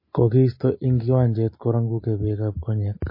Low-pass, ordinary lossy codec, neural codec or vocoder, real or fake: 5.4 kHz; MP3, 24 kbps; none; real